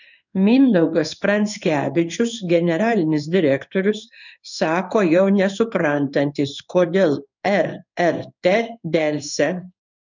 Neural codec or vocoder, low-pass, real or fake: codec, 16 kHz in and 24 kHz out, 1 kbps, XY-Tokenizer; 7.2 kHz; fake